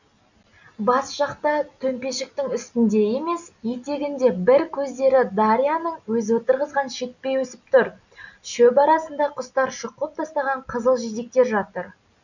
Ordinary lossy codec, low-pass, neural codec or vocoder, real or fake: none; 7.2 kHz; none; real